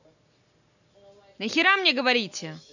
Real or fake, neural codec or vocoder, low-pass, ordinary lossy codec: real; none; 7.2 kHz; none